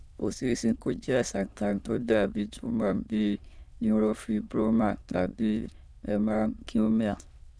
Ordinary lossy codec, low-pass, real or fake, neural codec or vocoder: none; none; fake; autoencoder, 22.05 kHz, a latent of 192 numbers a frame, VITS, trained on many speakers